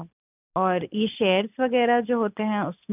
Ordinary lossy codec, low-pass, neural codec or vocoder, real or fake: none; 3.6 kHz; none; real